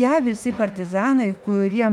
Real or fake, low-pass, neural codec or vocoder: fake; 19.8 kHz; autoencoder, 48 kHz, 32 numbers a frame, DAC-VAE, trained on Japanese speech